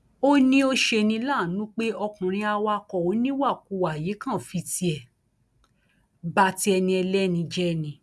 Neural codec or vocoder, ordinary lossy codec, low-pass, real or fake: none; none; none; real